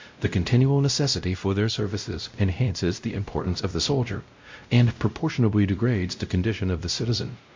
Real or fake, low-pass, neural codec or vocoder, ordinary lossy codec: fake; 7.2 kHz; codec, 16 kHz, 0.5 kbps, X-Codec, WavLM features, trained on Multilingual LibriSpeech; MP3, 48 kbps